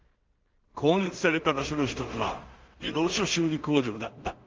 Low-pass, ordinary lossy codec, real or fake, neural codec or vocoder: 7.2 kHz; Opus, 32 kbps; fake; codec, 16 kHz in and 24 kHz out, 0.4 kbps, LongCat-Audio-Codec, two codebook decoder